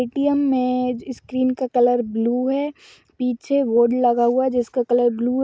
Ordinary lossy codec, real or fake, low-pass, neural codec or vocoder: none; real; none; none